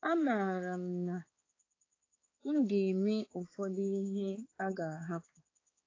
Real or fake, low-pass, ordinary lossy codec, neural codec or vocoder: fake; 7.2 kHz; AAC, 32 kbps; codec, 16 kHz, 4 kbps, X-Codec, HuBERT features, trained on balanced general audio